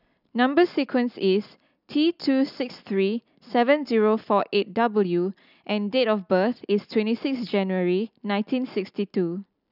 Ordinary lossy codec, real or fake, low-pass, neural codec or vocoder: none; real; 5.4 kHz; none